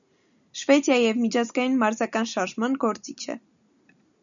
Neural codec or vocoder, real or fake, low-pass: none; real; 7.2 kHz